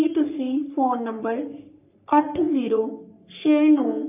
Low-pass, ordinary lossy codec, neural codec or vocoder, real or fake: 3.6 kHz; none; codec, 44.1 kHz, 3.4 kbps, Pupu-Codec; fake